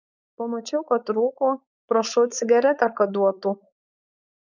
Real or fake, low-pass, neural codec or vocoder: fake; 7.2 kHz; codec, 16 kHz, 4.8 kbps, FACodec